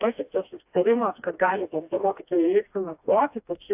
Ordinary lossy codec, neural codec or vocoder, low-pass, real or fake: AAC, 32 kbps; codec, 16 kHz, 1 kbps, FreqCodec, smaller model; 3.6 kHz; fake